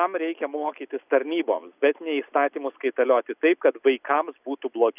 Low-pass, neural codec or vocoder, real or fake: 3.6 kHz; none; real